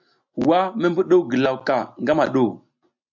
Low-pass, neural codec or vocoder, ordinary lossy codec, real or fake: 7.2 kHz; none; MP3, 64 kbps; real